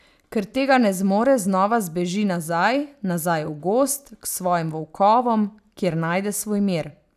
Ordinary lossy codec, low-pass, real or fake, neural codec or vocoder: none; 14.4 kHz; real; none